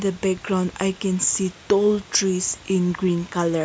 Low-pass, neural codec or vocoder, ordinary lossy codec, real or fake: none; none; none; real